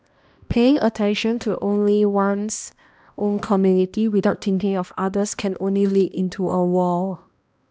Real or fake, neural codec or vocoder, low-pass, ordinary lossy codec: fake; codec, 16 kHz, 1 kbps, X-Codec, HuBERT features, trained on balanced general audio; none; none